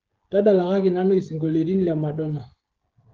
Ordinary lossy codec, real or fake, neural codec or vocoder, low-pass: Opus, 16 kbps; fake; codec, 16 kHz, 16 kbps, FreqCodec, smaller model; 7.2 kHz